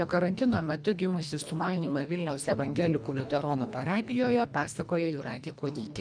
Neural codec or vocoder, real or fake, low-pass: codec, 24 kHz, 1.5 kbps, HILCodec; fake; 9.9 kHz